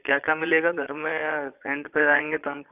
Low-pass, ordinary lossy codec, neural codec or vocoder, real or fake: 3.6 kHz; AAC, 32 kbps; vocoder, 22.05 kHz, 80 mel bands, Vocos; fake